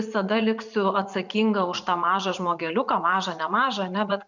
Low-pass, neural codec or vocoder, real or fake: 7.2 kHz; vocoder, 44.1 kHz, 80 mel bands, Vocos; fake